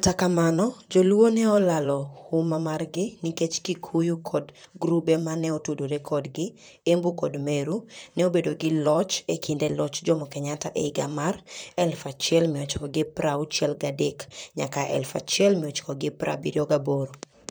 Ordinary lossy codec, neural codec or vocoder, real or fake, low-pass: none; vocoder, 44.1 kHz, 128 mel bands, Pupu-Vocoder; fake; none